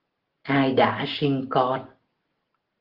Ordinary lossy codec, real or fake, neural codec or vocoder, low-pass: Opus, 16 kbps; real; none; 5.4 kHz